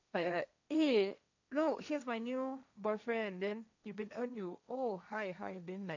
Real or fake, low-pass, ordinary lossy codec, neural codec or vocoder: fake; 7.2 kHz; none; codec, 16 kHz, 1.1 kbps, Voila-Tokenizer